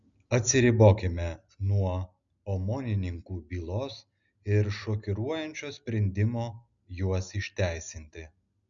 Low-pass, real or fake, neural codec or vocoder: 7.2 kHz; real; none